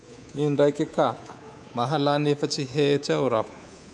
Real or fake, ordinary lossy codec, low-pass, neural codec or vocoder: fake; none; none; codec, 24 kHz, 3.1 kbps, DualCodec